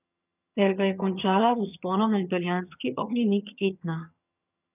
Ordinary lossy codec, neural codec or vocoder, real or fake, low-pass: none; vocoder, 22.05 kHz, 80 mel bands, HiFi-GAN; fake; 3.6 kHz